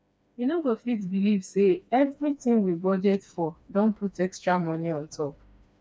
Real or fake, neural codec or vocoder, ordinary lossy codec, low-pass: fake; codec, 16 kHz, 2 kbps, FreqCodec, smaller model; none; none